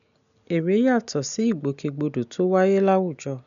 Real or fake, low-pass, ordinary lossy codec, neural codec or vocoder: real; 7.2 kHz; none; none